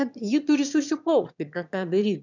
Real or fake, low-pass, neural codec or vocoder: fake; 7.2 kHz; autoencoder, 22.05 kHz, a latent of 192 numbers a frame, VITS, trained on one speaker